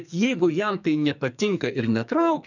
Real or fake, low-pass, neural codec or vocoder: fake; 7.2 kHz; codec, 44.1 kHz, 2.6 kbps, SNAC